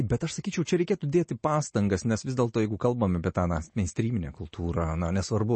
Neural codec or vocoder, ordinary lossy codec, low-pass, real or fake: none; MP3, 32 kbps; 10.8 kHz; real